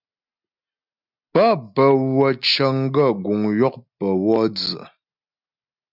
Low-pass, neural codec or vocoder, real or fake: 5.4 kHz; none; real